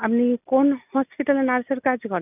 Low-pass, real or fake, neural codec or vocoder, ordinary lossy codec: 3.6 kHz; real; none; none